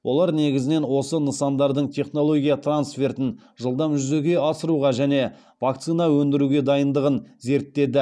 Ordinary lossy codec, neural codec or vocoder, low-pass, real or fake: none; none; none; real